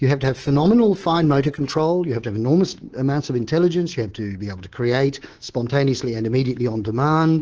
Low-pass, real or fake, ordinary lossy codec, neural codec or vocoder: 7.2 kHz; fake; Opus, 16 kbps; codec, 24 kHz, 3.1 kbps, DualCodec